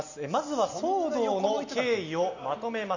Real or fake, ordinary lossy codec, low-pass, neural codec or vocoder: real; none; 7.2 kHz; none